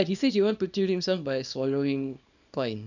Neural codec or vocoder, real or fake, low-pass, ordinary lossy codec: codec, 24 kHz, 0.9 kbps, WavTokenizer, small release; fake; 7.2 kHz; none